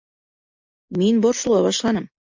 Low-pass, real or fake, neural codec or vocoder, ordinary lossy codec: 7.2 kHz; real; none; MP3, 48 kbps